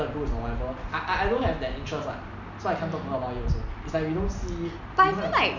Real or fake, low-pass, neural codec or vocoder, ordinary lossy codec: real; 7.2 kHz; none; none